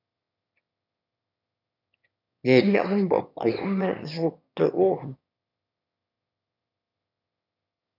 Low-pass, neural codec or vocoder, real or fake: 5.4 kHz; autoencoder, 22.05 kHz, a latent of 192 numbers a frame, VITS, trained on one speaker; fake